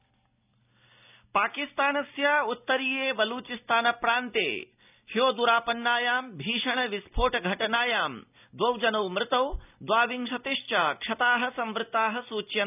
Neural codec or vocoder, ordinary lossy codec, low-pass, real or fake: none; none; 3.6 kHz; real